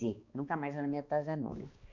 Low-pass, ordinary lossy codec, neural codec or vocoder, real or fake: 7.2 kHz; Opus, 64 kbps; codec, 16 kHz, 2 kbps, X-Codec, HuBERT features, trained on balanced general audio; fake